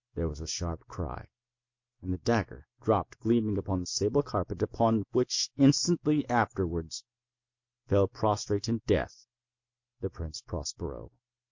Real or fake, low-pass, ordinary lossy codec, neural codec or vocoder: real; 7.2 kHz; MP3, 48 kbps; none